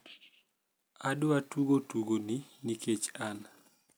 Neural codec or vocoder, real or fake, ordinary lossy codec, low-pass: none; real; none; none